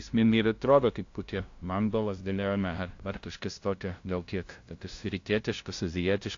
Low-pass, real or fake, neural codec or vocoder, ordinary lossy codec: 7.2 kHz; fake; codec, 16 kHz, 0.5 kbps, FunCodec, trained on LibriTTS, 25 frames a second; AAC, 48 kbps